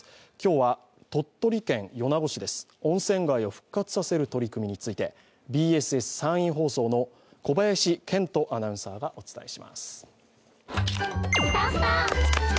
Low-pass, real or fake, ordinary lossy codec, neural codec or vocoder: none; real; none; none